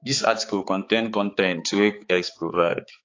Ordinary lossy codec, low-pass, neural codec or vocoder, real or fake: AAC, 32 kbps; 7.2 kHz; codec, 16 kHz, 4 kbps, X-Codec, HuBERT features, trained on balanced general audio; fake